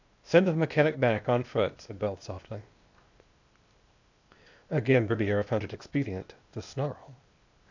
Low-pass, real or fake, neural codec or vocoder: 7.2 kHz; fake; codec, 16 kHz, 0.8 kbps, ZipCodec